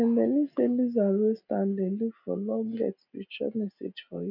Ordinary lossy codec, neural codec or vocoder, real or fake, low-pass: none; none; real; 5.4 kHz